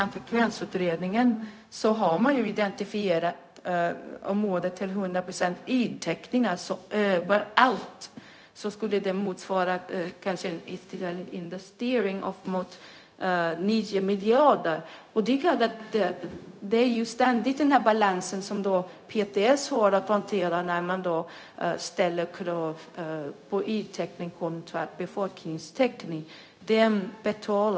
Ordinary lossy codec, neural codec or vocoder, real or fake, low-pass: none; codec, 16 kHz, 0.4 kbps, LongCat-Audio-Codec; fake; none